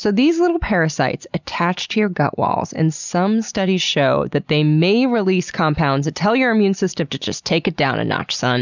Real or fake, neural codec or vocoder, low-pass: real; none; 7.2 kHz